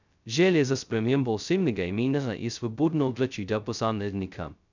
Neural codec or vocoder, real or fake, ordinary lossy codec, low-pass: codec, 16 kHz, 0.2 kbps, FocalCodec; fake; none; 7.2 kHz